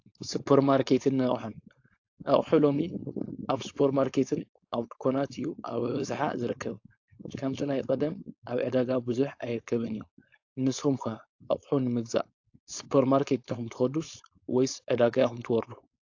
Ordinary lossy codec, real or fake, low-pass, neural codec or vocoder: AAC, 48 kbps; fake; 7.2 kHz; codec, 16 kHz, 4.8 kbps, FACodec